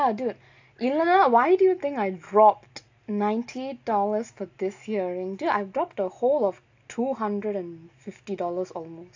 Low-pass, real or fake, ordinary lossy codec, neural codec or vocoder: 7.2 kHz; real; AAC, 48 kbps; none